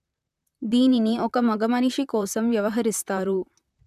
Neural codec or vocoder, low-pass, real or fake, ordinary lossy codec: vocoder, 44.1 kHz, 128 mel bands, Pupu-Vocoder; 14.4 kHz; fake; none